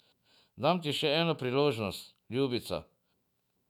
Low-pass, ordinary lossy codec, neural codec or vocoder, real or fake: 19.8 kHz; none; autoencoder, 48 kHz, 128 numbers a frame, DAC-VAE, trained on Japanese speech; fake